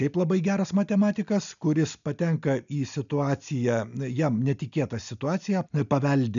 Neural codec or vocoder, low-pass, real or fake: none; 7.2 kHz; real